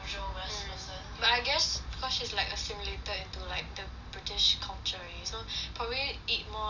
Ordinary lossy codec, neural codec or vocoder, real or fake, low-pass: none; none; real; 7.2 kHz